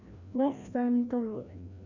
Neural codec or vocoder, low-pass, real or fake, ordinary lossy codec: codec, 16 kHz, 1 kbps, FreqCodec, larger model; 7.2 kHz; fake; none